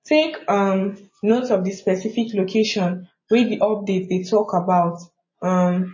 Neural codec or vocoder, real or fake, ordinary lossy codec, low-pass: none; real; MP3, 32 kbps; 7.2 kHz